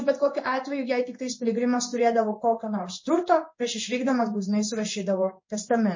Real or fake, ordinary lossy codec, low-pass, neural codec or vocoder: fake; MP3, 32 kbps; 7.2 kHz; codec, 16 kHz in and 24 kHz out, 1 kbps, XY-Tokenizer